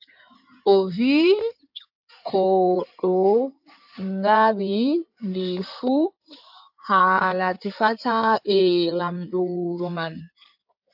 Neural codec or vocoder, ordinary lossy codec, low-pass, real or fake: codec, 16 kHz in and 24 kHz out, 2.2 kbps, FireRedTTS-2 codec; AAC, 48 kbps; 5.4 kHz; fake